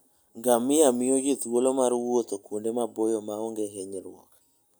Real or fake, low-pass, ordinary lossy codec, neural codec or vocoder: real; none; none; none